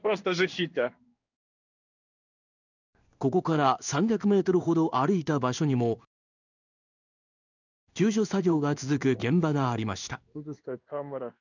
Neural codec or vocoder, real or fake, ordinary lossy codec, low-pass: codec, 16 kHz in and 24 kHz out, 1 kbps, XY-Tokenizer; fake; none; 7.2 kHz